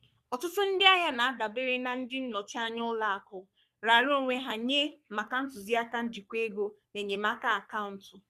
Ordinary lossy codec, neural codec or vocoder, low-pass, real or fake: none; codec, 44.1 kHz, 3.4 kbps, Pupu-Codec; 14.4 kHz; fake